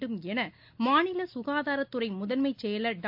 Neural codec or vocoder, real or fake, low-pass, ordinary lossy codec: none; real; 5.4 kHz; AAC, 48 kbps